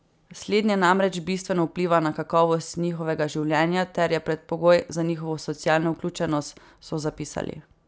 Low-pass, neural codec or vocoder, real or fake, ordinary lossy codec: none; none; real; none